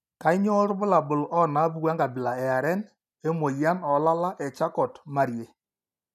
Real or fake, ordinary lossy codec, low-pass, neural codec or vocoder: real; none; 14.4 kHz; none